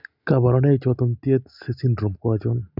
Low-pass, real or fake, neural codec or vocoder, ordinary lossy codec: 5.4 kHz; real; none; none